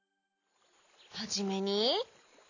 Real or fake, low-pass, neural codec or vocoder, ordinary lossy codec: real; 7.2 kHz; none; none